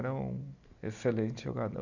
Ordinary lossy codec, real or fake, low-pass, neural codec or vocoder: none; real; 7.2 kHz; none